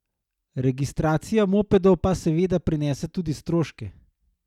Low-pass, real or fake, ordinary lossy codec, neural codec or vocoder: 19.8 kHz; real; none; none